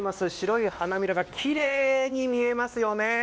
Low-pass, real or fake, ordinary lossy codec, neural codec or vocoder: none; fake; none; codec, 16 kHz, 2 kbps, X-Codec, WavLM features, trained on Multilingual LibriSpeech